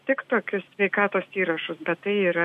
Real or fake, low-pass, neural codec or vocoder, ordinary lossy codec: real; 14.4 kHz; none; MP3, 64 kbps